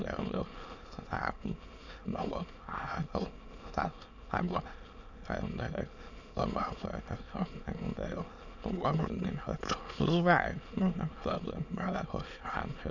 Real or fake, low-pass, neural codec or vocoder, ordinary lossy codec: fake; 7.2 kHz; autoencoder, 22.05 kHz, a latent of 192 numbers a frame, VITS, trained on many speakers; none